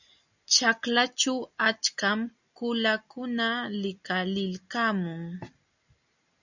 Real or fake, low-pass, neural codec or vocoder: real; 7.2 kHz; none